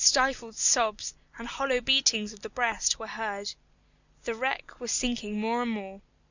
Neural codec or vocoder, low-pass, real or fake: none; 7.2 kHz; real